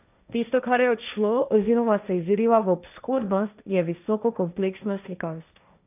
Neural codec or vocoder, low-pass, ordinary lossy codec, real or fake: codec, 16 kHz, 1.1 kbps, Voila-Tokenizer; 3.6 kHz; none; fake